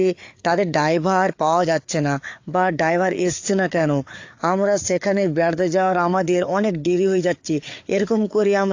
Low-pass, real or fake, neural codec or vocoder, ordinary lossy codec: 7.2 kHz; fake; codec, 16 kHz, 4 kbps, FreqCodec, larger model; AAC, 48 kbps